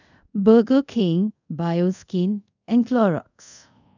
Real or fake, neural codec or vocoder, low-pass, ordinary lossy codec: fake; codec, 24 kHz, 0.5 kbps, DualCodec; 7.2 kHz; none